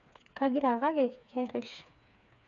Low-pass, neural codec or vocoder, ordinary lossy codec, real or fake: 7.2 kHz; codec, 16 kHz, 4 kbps, FreqCodec, smaller model; none; fake